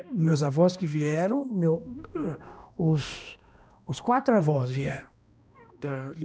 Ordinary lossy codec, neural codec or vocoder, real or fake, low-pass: none; codec, 16 kHz, 1 kbps, X-Codec, HuBERT features, trained on balanced general audio; fake; none